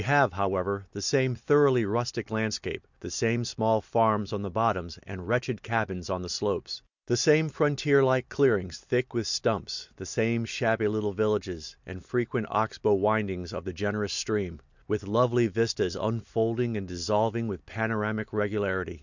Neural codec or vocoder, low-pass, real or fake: none; 7.2 kHz; real